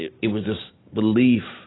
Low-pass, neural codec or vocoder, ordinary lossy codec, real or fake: 7.2 kHz; none; AAC, 16 kbps; real